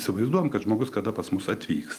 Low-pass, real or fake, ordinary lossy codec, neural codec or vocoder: 14.4 kHz; real; Opus, 32 kbps; none